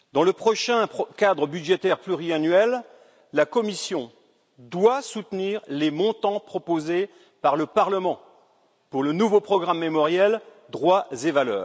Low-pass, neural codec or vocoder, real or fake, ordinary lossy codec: none; none; real; none